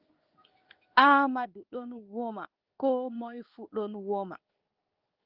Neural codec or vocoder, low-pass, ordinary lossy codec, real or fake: codec, 16 kHz in and 24 kHz out, 1 kbps, XY-Tokenizer; 5.4 kHz; Opus, 32 kbps; fake